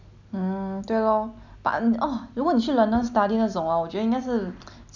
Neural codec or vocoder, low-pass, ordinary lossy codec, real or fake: none; 7.2 kHz; none; real